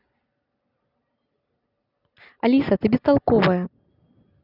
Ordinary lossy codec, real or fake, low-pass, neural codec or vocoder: none; real; 5.4 kHz; none